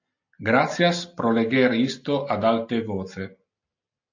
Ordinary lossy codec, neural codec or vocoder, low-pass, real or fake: AAC, 48 kbps; none; 7.2 kHz; real